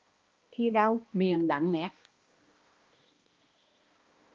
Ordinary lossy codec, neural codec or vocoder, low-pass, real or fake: Opus, 24 kbps; codec, 16 kHz, 1 kbps, X-Codec, HuBERT features, trained on balanced general audio; 7.2 kHz; fake